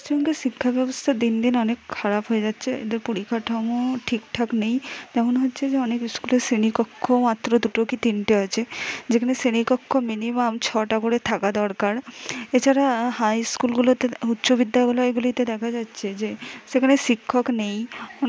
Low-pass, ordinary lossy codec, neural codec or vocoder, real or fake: none; none; none; real